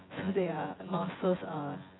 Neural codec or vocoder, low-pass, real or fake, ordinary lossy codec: vocoder, 24 kHz, 100 mel bands, Vocos; 7.2 kHz; fake; AAC, 16 kbps